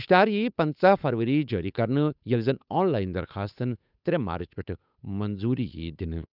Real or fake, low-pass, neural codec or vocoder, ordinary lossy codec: fake; 5.4 kHz; codec, 16 kHz, 8 kbps, FunCodec, trained on Chinese and English, 25 frames a second; none